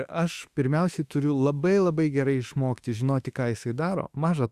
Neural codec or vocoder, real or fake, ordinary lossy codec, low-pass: autoencoder, 48 kHz, 32 numbers a frame, DAC-VAE, trained on Japanese speech; fake; Opus, 64 kbps; 14.4 kHz